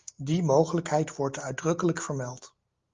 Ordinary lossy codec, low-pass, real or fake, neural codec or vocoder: Opus, 32 kbps; 7.2 kHz; real; none